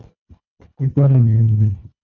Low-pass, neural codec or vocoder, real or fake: 7.2 kHz; codec, 24 kHz, 1.5 kbps, HILCodec; fake